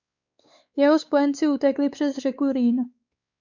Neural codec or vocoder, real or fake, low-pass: codec, 16 kHz, 4 kbps, X-Codec, WavLM features, trained on Multilingual LibriSpeech; fake; 7.2 kHz